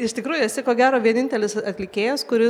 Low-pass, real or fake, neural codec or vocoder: 19.8 kHz; real; none